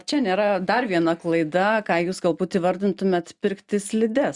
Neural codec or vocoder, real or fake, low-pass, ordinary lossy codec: none; real; 10.8 kHz; Opus, 64 kbps